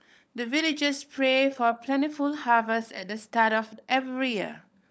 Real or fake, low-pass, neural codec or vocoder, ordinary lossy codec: fake; none; codec, 16 kHz, 16 kbps, FunCodec, trained on LibriTTS, 50 frames a second; none